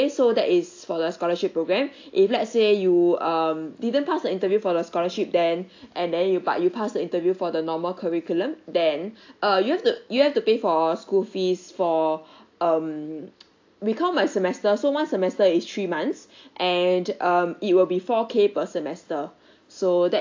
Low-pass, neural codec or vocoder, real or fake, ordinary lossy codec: 7.2 kHz; none; real; AAC, 48 kbps